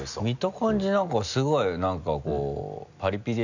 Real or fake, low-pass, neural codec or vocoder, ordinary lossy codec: real; 7.2 kHz; none; none